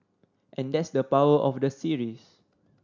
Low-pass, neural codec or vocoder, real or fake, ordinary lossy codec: 7.2 kHz; none; real; none